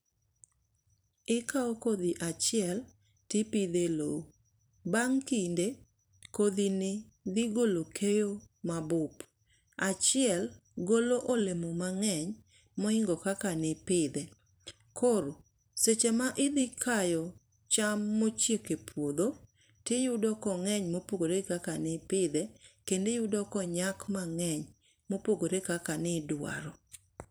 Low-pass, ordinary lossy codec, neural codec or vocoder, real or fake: none; none; vocoder, 44.1 kHz, 128 mel bands every 256 samples, BigVGAN v2; fake